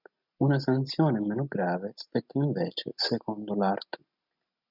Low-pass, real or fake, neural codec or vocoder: 5.4 kHz; real; none